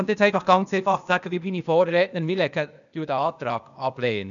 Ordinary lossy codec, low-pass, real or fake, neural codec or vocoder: none; 7.2 kHz; fake; codec, 16 kHz, 0.8 kbps, ZipCodec